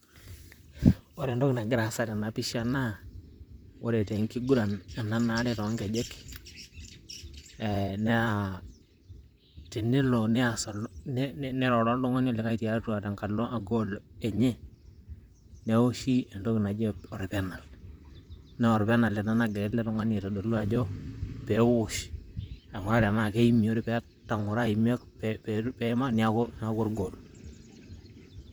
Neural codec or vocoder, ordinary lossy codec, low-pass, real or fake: vocoder, 44.1 kHz, 128 mel bands, Pupu-Vocoder; none; none; fake